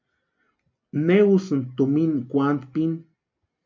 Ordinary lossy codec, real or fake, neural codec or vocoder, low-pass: MP3, 48 kbps; real; none; 7.2 kHz